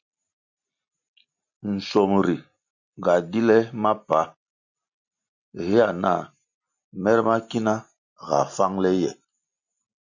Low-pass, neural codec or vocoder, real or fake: 7.2 kHz; none; real